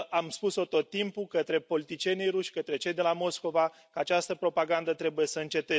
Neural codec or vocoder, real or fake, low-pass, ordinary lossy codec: none; real; none; none